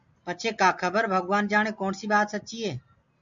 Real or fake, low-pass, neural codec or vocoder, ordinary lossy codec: real; 7.2 kHz; none; MP3, 96 kbps